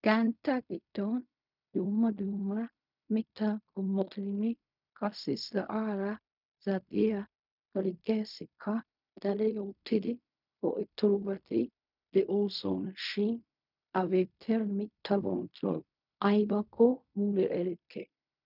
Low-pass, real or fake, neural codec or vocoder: 5.4 kHz; fake; codec, 16 kHz in and 24 kHz out, 0.4 kbps, LongCat-Audio-Codec, fine tuned four codebook decoder